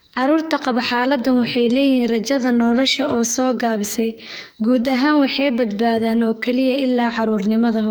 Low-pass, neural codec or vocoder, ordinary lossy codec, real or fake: none; codec, 44.1 kHz, 2.6 kbps, SNAC; none; fake